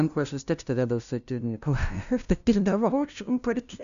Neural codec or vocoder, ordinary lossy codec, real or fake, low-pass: codec, 16 kHz, 0.5 kbps, FunCodec, trained on LibriTTS, 25 frames a second; MP3, 96 kbps; fake; 7.2 kHz